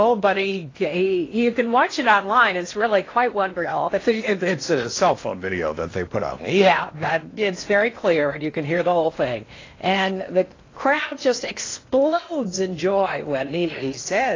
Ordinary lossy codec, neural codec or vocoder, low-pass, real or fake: AAC, 32 kbps; codec, 16 kHz in and 24 kHz out, 0.8 kbps, FocalCodec, streaming, 65536 codes; 7.2 kHz; fake